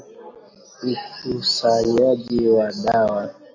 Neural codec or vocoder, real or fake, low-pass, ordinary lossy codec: none; real; 7.2 kHz; MP3, 64 kbps